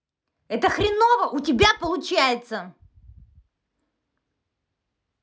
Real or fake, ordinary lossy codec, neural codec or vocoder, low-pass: real; none; none; none